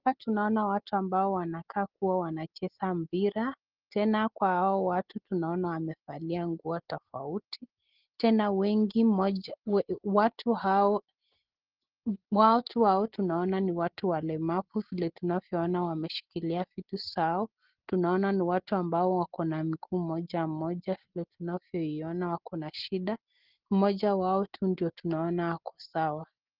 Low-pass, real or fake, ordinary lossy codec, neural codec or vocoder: 5.4 kHz; real; Opus, 16 kbps; none